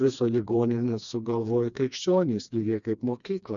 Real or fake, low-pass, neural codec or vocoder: fake; 7.2 kHz; codec, 16 kHz, 2 kbps, FreqCodec, smaller model